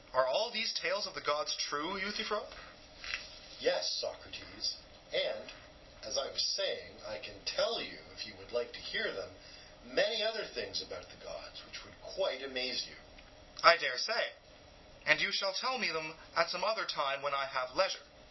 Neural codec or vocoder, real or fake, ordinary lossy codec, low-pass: none; real; MP3, 24 kbps; 7.2 kHz